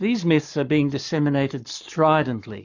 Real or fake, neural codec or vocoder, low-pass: fake; codec, 44.1 kHz, 7.8 kbps, Pupu-Codec; 7.2 kHz